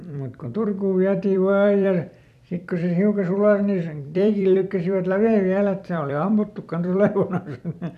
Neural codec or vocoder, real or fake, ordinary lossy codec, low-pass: vocoder, 44.1 kHz, 128 mel bands every 256 samples, BigVGAN v2; fake; none; 14.4 kHz